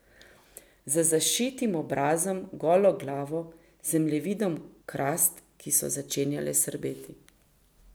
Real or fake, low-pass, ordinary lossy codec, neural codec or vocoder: real; none; none; none